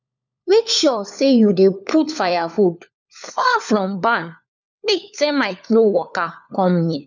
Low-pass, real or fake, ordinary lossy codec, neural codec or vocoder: 7.2 kHz; fake; none; codec, 16 kHz, 4 kbps, FunCodec, trained on LibriTTS, 50 frames a second